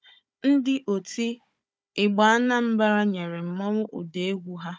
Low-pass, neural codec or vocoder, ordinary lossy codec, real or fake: none; codec, 16 kHz, 16 kbps, FunCodec, trained on Chinese and English, 50 frames a second; none; fake